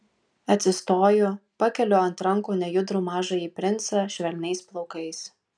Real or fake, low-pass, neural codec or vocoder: real; 9.9 kHz; none